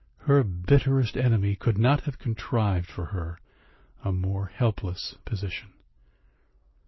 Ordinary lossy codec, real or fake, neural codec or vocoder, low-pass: MP3, 24 kbps; real; none; 7.2 kHz